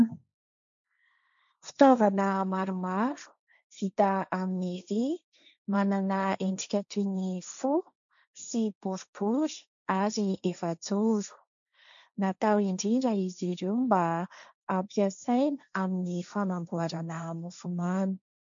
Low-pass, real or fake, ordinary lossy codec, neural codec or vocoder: 7.2 kHz; fake; MP3, 64 kbps; codec, 16 kHz, 1.1 kbps, Voila-Tokenizer